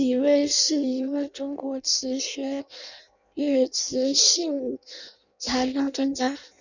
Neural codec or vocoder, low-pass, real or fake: codec, 16 kHz in and 24 kHz out, 0.6 kbps, FireRedTTS-2 codec; 7.2 kHz; fake